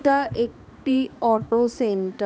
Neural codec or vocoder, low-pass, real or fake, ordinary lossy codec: codec, 16 kHz, 2 kbps, X-Codec, HuBERT features, trained on balanced general audio; none; fake; none